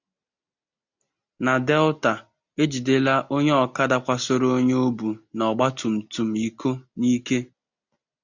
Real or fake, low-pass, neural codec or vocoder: real; 7.2 kHz; none